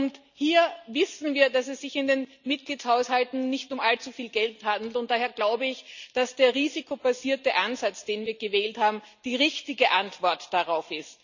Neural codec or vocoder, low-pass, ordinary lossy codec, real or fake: none; 7.2 kHz; none; real